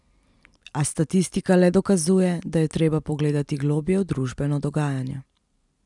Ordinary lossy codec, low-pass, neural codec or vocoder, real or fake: none; 10.8 kHz; none; real